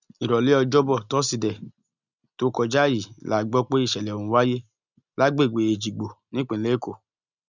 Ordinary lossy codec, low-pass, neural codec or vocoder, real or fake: none; 7.2 kHz; none; real